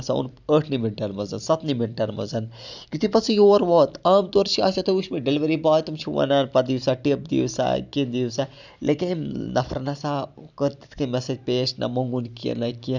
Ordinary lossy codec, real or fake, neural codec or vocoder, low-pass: none; real; none; 7.2 kHz